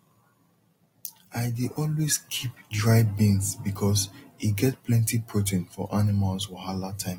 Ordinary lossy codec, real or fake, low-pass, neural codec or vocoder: AAC, 48 kbps; real; 19.8 kHz; none